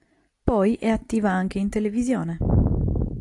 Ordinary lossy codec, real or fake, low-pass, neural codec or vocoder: AAC, 48 kbps; real; 10.8 kHz; none